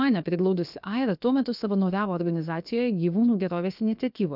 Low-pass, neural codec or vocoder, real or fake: 5.4 kHz; codec, 16 kHz, about 1 kbps, DyCAST, with the encoder's durations; fake